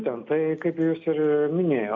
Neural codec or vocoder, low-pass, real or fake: none; 7.2 kHz; real